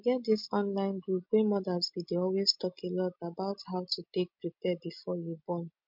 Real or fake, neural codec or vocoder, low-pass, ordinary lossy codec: real; none; 5.4 kHz; MP3, 48 kbps